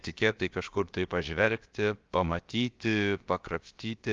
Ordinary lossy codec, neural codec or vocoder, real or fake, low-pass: Opus, 32 kbps; codec, 16 kHz, about 1 kbps, DyCAST, with the encoder's durations; fake; 7.2 kHz